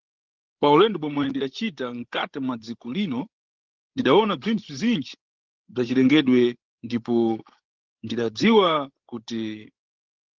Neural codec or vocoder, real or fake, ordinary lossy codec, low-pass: codec, 16 kHz, 16 kbps, FreqCodec, larger model; fake; Opus, 16 kbps; 7.2 kHz